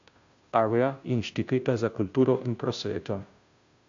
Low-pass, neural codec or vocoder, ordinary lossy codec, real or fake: 7.2 kHz; codec, 16 kHz, 0.5 kbps, FunCodec, trained on Chinese and English, 25 frames a second; AAC, 64 kbps; fake